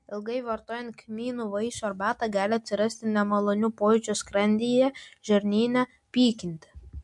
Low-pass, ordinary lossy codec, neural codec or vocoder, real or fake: 10.8 kHz; MP3, 64 kbps; none; real